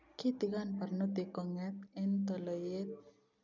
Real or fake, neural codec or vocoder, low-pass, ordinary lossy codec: real; none; 7.2 kHz; none